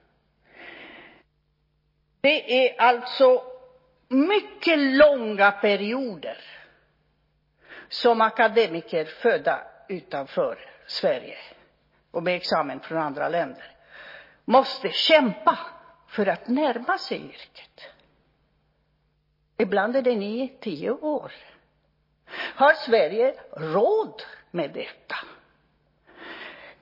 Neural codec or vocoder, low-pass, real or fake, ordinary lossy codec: none; 5.4 kHz; real; MP3, 24 kbps